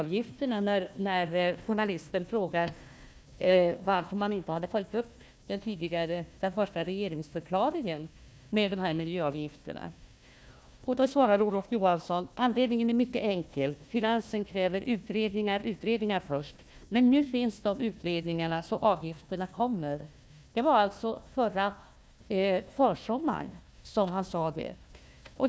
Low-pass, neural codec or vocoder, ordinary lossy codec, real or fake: none; codec, 16 kHz, 1 kbps, FunCodec, trained on Chinese and English, 50 frames a second; none; fake